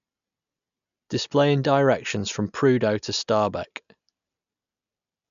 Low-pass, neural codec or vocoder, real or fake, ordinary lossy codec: 7.2 kHz; none; real; MP3, 96 kbps